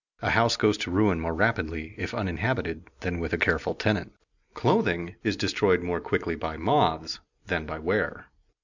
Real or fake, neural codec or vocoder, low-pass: real; none; 7.2 kHz